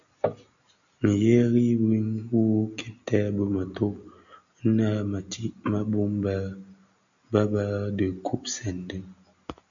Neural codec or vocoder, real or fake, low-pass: none; real; 7.2 kHz